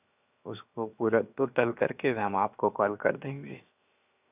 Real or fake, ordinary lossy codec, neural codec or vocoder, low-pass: fake; none; codec, 16 kHz, 0.7 kbps, FocalCodec; 3.6 kHz